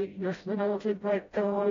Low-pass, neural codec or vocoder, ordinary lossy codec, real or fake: 7.2 kHz; codec, 16 kHz, 0.5 kbps, FreqCodec, smaller model; AAC, 24 kbps; fake